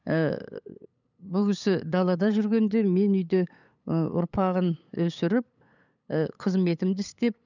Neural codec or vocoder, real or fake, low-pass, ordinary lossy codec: codec, 16 kHz, 8 kbps, FunCodec, trained on LibriTTS, 25 frames a second; fake; 7.2 kHz; none